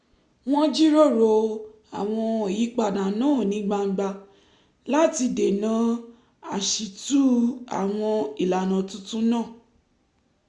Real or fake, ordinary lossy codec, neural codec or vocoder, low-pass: real; Opus, 64 kbps; none; 10.8 kHz